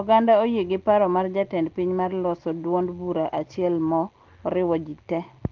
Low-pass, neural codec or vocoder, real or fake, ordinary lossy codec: 7.2 kHz; none; real; Opus, 16 kbps